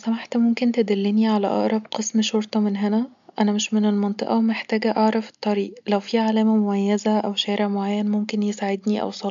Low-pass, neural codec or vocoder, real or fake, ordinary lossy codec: 7.2 kHz; none; real; MP3, 64 kbps